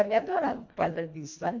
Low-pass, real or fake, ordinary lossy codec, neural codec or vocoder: 7.2 kHz; fake; AAC, 48 kbps; codec, 24 kHz, 1.5 kbps, HILCodec